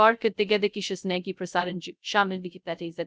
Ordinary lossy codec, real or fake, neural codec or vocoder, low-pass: none; fake; codec, 16 kHz, 0.2 kbps, FocalCodec; none